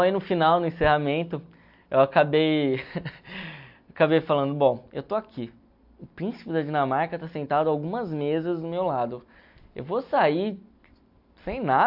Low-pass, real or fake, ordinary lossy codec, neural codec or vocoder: 5.4 kHz; real; AAC, 48 kbps; none